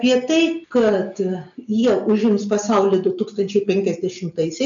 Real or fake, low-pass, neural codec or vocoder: real; 7.2 kHz; none